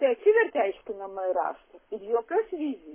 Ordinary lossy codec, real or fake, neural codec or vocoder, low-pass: MP3, 16 kbps; fake; codec, 44.1 kHz, 7.8 kbps, Pupu-Codec; 3.6 kHz